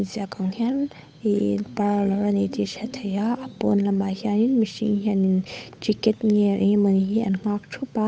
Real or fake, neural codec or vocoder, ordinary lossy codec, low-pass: fake; codec, 16 kHz, 8 kbps, FunCodec, trained on Chinese and English, 25 frames a second; none; none